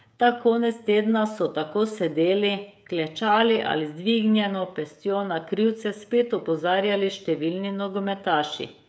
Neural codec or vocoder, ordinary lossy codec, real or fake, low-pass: codec, 16 kHz, 16 kbps, FreqCodec, smaller model; none; fake; none